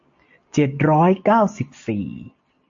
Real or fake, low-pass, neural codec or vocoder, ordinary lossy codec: real; 7.2 kHz; none; AAC, 48 kbps